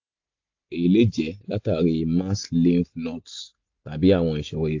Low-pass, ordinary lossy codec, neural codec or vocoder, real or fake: 7.2 kHz; AAC, 48 kbps; none; real